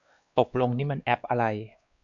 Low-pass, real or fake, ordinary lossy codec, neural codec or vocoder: 7.2 kHz; fake; MP3, 96 kbps; codec, 16 kHz, 2 kbps, X-Codec, WavLM features, trained on Multilingual LibriSpeech